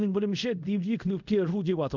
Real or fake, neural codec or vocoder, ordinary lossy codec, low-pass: fake; codec, 24 kHz, 0.5 kbps, DualCodec; none; 7.2 kHz